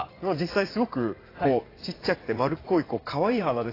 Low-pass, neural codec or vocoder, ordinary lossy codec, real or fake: 5.4 kHz; vocoder, 44.1 kHz, 80 mel bands, Vocos; AAC, 24 kbps; fake